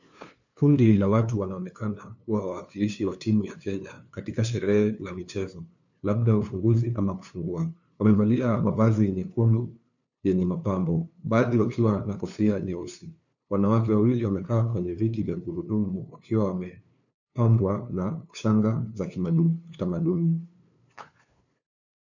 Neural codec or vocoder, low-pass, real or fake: codec, 16 kHz, 2 kbps, FunCodec, trained on LibriTTS, 25 frames a second; 7.2 kHz; fake